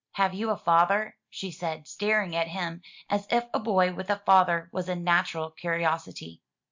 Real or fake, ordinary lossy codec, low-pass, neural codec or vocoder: real; MP3, 64 kbps; 7.2 kHz; none